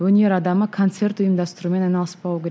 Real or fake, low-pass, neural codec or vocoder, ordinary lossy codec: real; none; none; none